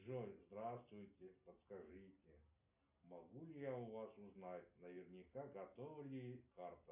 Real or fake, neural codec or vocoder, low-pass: real; none; 3.6 kHz